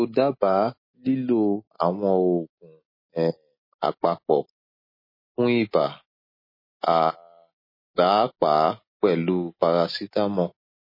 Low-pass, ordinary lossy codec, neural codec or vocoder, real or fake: 5.4 kHz; MP3, 24 kbps; none; real